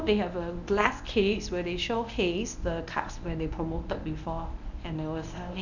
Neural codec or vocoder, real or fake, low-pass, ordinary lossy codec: codec, 24 kHz, 0.9 kbps, WavTokenizer, medium speech release version 1; fake; 7.2 kHz; none